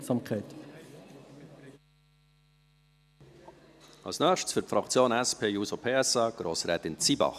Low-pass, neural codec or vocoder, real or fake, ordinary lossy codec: 14.4 kHz; none; real; none